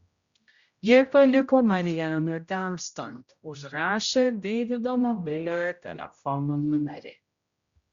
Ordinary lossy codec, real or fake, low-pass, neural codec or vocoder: Opus, 64 kbps; fake; 7.2 kHz; codec, 16 kHz, 0.5 kbps, X-Codec, HuBERT features, trained on general audio